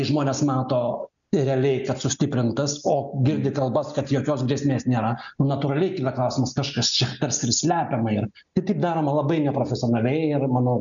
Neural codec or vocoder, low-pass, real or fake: none; 7.2 kHz; real